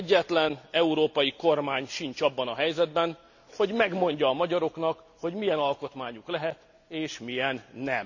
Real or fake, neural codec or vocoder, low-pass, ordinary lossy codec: real; none; 7.2 kHz; none